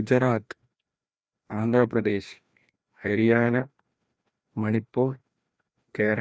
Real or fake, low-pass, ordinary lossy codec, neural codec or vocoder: fake; none; none; codec, 16 kHz, 1 kbps, FreqCodec, larger model